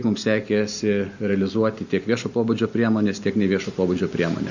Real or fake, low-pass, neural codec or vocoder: real; 7.2 kHz; none